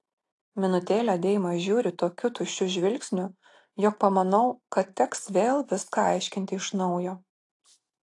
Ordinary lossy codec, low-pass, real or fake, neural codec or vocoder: AAC, 48 kbps; 10.8 kHz; real; none